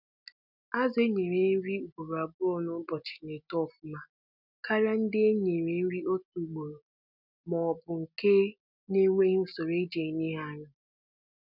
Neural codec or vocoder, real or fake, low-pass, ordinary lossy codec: none; real; 5.4 kHz; none